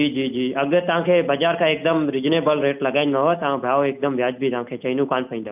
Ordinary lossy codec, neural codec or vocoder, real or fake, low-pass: none; none; real; 3.6 kHz